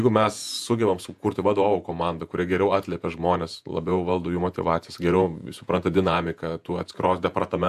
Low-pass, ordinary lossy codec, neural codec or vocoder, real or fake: 14.4 kHz; AAC, 96 kbps; vocoder, 48 kHz, 128 mel bands, Vocos; fake